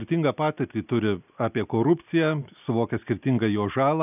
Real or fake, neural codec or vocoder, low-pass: real; none; 3.6 kHz